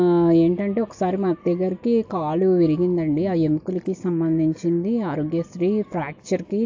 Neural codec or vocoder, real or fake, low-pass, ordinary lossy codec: none; real; 7.2 kHz; AAC, 48 kbps